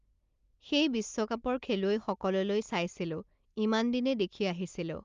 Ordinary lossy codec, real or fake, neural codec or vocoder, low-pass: Opus, 32 kbps; real; none; 7.2 kHz